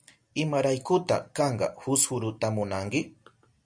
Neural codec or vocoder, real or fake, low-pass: none; real; 9.9 kHz